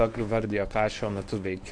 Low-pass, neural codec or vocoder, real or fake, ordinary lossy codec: 9.9 kHz; codec, 24 kHz, 0.9 kbps, WavTokenizer, medium speech release version 1; fake; AAC, 64 kbps